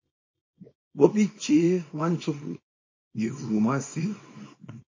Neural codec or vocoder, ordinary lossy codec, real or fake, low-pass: codec, 24 kHz, 0.9 kbps, WavTokenizer, small release; MP3, 32 kbps; fake; 7.2 kHz